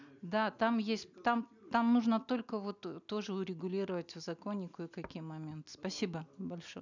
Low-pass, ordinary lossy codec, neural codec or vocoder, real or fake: 7.2 kHz; none; none; real